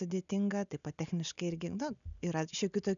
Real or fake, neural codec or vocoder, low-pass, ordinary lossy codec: real; none; 7.2 kHz; MP3, 96 kbps